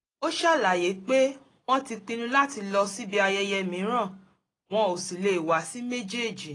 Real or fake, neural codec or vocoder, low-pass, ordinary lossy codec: real; none; 10.8 kHz; AAC, 32 kbps